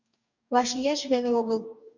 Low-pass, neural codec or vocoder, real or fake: 7.2 kHz; codec, 44.1 kHz, 2.6 kbps, DAC; fake